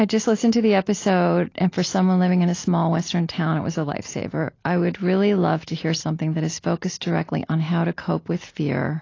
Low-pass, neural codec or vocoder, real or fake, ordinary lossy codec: 7.2 kHz; none; real; AAC, 32 kbps